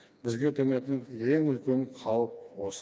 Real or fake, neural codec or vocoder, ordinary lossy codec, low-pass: fake; codec, 16 kHz, 2 kbps, FreqCodec, smaller model; none; none